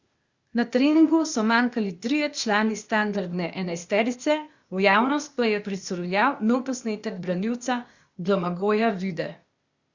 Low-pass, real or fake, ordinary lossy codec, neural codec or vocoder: 7.2 kHz; fake; Opus, 64 kbps; codec, 16 kHz, 0.8 kbps, ZipCodec